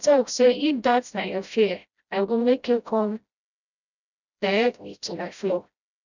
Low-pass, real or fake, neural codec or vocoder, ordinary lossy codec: 7.2 kHz; fake; codec, 16 kHz, 0.5 kbps, FreqCodec, smaller model; none